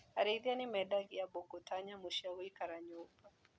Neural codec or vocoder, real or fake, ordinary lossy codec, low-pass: none; real; none; none